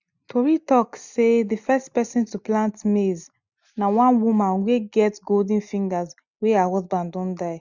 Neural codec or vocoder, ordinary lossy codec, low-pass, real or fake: none; none; 7.2 kHz; real